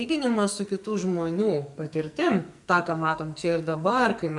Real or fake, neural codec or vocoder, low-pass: fake; codec, 44.1 kHz, 2.6 kbps, SNAC; 10.8 kHz